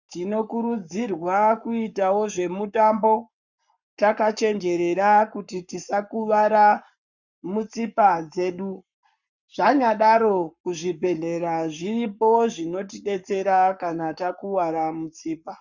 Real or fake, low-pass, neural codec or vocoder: fake; 7.2 kHz; codec, 44.1 kHz, 7.8 kbps, Pupu-Codec